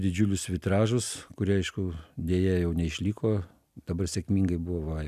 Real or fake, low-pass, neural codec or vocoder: real; 14.4 kHz; none